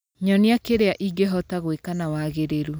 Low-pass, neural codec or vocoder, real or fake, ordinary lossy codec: none; none; real; none